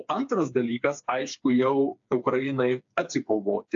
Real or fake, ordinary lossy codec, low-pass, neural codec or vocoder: fake; AAC, 64 kbps; 7.2 kHz; codec, 16 kHz, 4 kbps, FreqCodec, smaller model